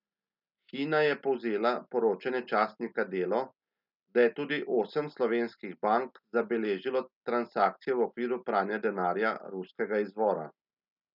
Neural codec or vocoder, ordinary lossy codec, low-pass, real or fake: none; none; 5.4 kHz; real